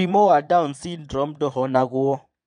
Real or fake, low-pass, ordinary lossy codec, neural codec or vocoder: fake; 9.9 kHz; none; vocoder, 22.05 kHz, 80 mel bands, Vocos